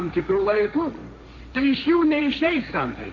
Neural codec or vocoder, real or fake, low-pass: codec, 16 kHz, 1.1 kbps, Voila-Tokenizer; fake; 7.2 kHz